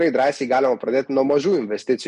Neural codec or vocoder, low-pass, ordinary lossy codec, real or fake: none; 14.4 kHz; MP3, 48 kbps; real